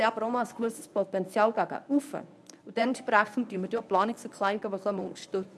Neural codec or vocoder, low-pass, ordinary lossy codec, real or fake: codec, 24 kHz, 0.9 kbps, WavTokenizer, medium speech release version 1; none; none; fake